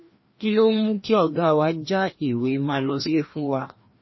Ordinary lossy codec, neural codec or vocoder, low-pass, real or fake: MP3, 24 kbps; codec, 16 kHz, 1 kbps, FreqCodec, larger model; 7.2 kHz; fake